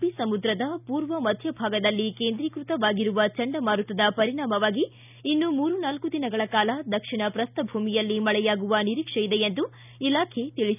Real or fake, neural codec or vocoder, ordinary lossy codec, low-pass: real; none; none; 3.6 kHz